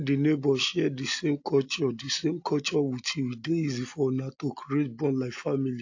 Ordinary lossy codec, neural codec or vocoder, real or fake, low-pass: AAC, 48 kbps; none; real; 7.2 kHz